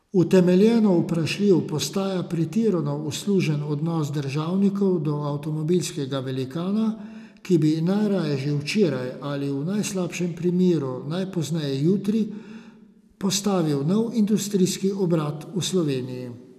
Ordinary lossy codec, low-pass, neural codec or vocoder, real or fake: none; 14.4 kHz; none; real